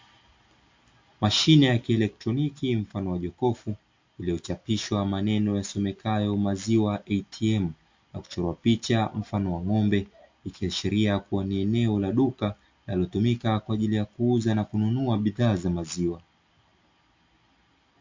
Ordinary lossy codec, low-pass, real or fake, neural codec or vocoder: MP3, 48 kbps; 7.2 kHz; real; none